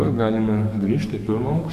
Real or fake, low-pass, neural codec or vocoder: fake; 14.4 kHz; codec, 44.1 kHz, 7.8 kbps, DAC